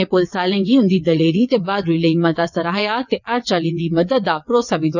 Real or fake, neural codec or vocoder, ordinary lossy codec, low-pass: fake; vocoder, 22.05 kHz, 80 mel bands, WaveNeXt; none; 7.2 kHz